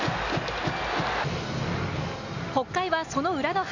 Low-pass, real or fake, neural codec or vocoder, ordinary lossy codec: 7.2 kHz; real; none; none